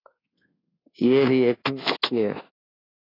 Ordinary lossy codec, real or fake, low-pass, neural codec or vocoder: AAC, 24 kbps; fake; 5.4 kHz; codec, 24 kHz, 1.2 kbps, DualCodec